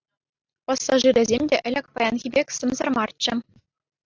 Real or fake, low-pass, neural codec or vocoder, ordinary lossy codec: real; 7.2 kHz; none; AAC, 48 kbps